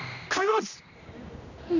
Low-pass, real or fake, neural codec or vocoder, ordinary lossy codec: 7.2 kHz; fake; codec, 16 kHz, 1 kbps, X-Codec, HuBERT features, trained on general audio; Opus, 64 kbps